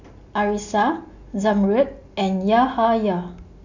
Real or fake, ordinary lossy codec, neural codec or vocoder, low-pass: real; none; none; 7.2 kHz